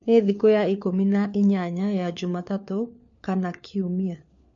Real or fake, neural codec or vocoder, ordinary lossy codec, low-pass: fake; codec, 16 kHz, 4 kbps, FunCodec, trained on LibriTTS, 50 frames a second; MP3, 48 kbps; 7.2 kHz